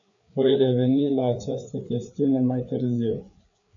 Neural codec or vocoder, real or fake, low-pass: codec, 16 kHz, 4 kbps, FreqCodec, larger model; fake; 7.2 kHz